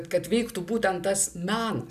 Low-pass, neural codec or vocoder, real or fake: 14.4 kHz; none; real